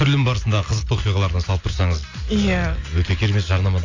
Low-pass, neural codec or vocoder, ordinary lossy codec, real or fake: 7.2 kHz; none; AAC, 48 kbps; real